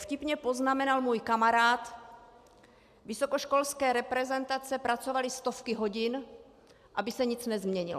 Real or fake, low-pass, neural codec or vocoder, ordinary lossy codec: real; 14.4 kHz; none; AAC, 96 kbps